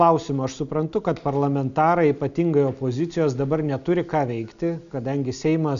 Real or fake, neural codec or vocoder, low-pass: real; none; 7.2 kHz